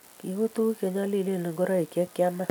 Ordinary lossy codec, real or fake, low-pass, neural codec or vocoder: none; real; none; none